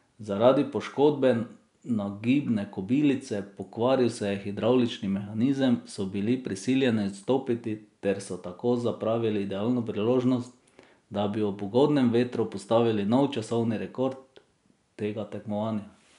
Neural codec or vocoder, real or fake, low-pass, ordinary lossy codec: none; real; 10.8 kHz; none